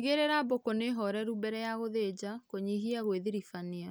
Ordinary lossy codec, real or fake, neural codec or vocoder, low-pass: none; real; none; none